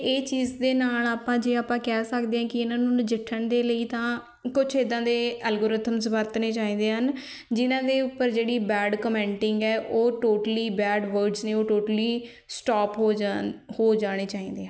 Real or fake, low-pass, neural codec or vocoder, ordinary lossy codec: real; none; none; none